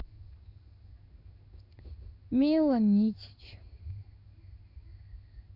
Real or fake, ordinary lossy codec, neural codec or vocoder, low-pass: fake; none; codec, 16 kHz in and 24 kHz out, 1 kbps, XY-Tokenizer; 5.4 kHz